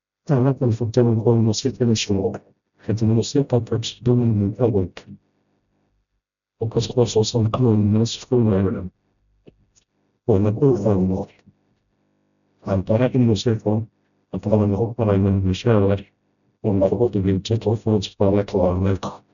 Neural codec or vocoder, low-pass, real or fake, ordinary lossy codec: codec, 16 kHz, 0.5 kbps, FreqCodec, smaller model; 7.2 kHz; fake; none